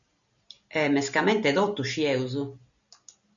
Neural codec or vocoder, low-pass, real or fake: none; 7.2 kHz; real